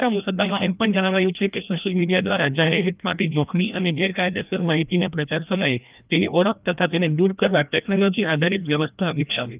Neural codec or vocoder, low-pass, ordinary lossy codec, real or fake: codec, 16 kHz, 1 kbps, FreqCodec, larger model; 3.6 kHz; Opus, 24 kbps; fake